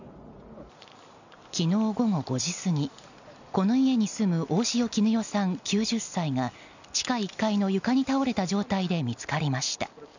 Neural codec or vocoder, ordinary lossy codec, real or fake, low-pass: none; none; real; 7.2 kHz